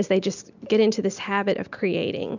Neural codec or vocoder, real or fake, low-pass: none; real; 7.2 kHz